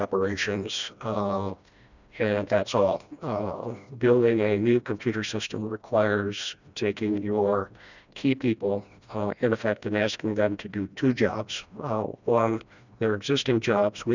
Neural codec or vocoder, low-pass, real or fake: codec, 16 kHz, 1 kbps, FreqCodec, smaller model; 7.2 kHz; fake